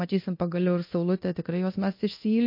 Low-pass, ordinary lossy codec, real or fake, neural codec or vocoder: 5.4 kHz; MP3, 32 kbps; fake; codec, 24 kHz, 0.9 kbps, DualCodec